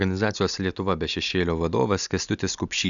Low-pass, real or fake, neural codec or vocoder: 7.2 kHz; real; none